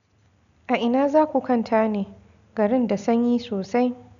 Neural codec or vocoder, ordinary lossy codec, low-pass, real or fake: none; none; 7.2 kHz; real